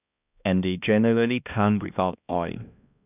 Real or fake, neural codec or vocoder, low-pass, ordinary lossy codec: fake; codec, 16 kHz, 1 kbps, X-Codec, HuBERT features, trained on balanced general audio; 3.6 kHz; none